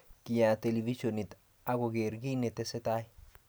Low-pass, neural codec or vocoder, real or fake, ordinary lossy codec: none; none; real; none